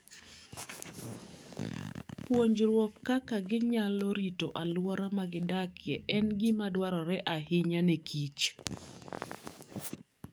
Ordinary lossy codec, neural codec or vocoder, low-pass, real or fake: none; codec, 44.1 kHz, 7.8 kbps, DAC; none; fake